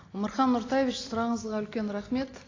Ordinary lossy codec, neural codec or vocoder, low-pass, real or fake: AAC, 32 kbps; none; 7.2 kHz; real